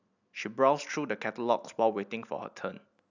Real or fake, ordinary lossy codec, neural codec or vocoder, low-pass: real; none; none; 7.2 kHz